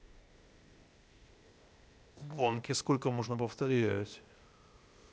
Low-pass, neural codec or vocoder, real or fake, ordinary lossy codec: none; codec, 16 kHz, 0.8 kbps, ZipCodec; fake; none